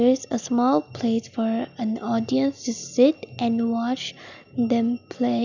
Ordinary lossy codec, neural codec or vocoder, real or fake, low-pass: none; none; real; 7.2 kHz